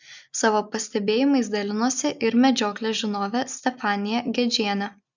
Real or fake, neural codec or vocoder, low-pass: real; none; 7.2 kHz